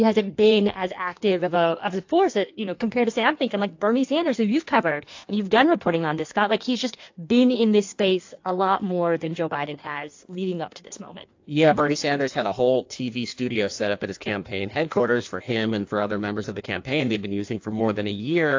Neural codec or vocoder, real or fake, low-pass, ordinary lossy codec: codec, 16 kHz in and 24 kHz out, 1.1 kbps, FireRedTTS-2 codec; fake; 7.2 kHz; AAC, 48 kbps